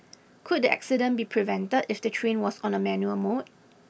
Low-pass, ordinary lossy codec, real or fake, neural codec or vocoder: none; none; real; none